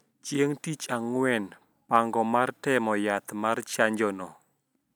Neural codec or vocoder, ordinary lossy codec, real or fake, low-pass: none; none; real; none